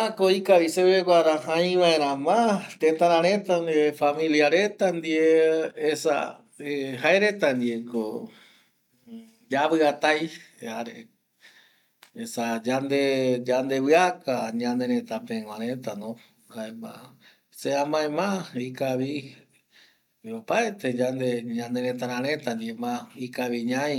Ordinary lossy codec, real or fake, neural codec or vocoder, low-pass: none; real; none; 19.8 kHz